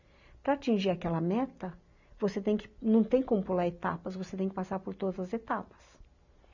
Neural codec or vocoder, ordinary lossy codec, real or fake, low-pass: none; none; real; 7.2 kHz